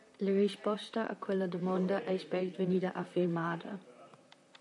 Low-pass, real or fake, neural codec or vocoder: 10.8 kHz; fake; vocoder, 44.1 kHz, 128 mel bands, Pupu-Vocoder